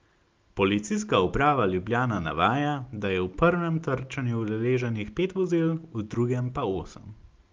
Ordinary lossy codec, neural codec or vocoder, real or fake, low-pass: Opus, 32 kbps; none; real; 7.2 kHz